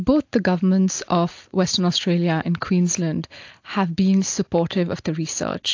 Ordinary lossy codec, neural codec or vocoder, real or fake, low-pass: AAC, 48 kbps; none; real; 7.2 kHz